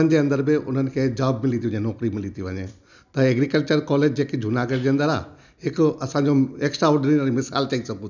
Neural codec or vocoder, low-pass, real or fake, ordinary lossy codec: none; 7.2 kHz; real; none